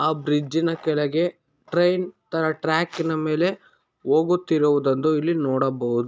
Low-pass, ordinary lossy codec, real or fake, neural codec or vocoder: none; none; real; none